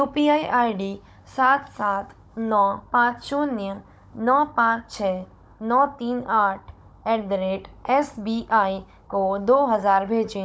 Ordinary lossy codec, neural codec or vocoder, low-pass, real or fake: none; codec, 16 kHz, 16 kbps, FunCodec, trained on Chinese and English, 50 frames a second; none; fake